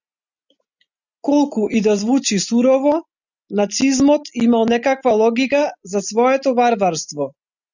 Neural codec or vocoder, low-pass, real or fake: none; 7.2 kHz; real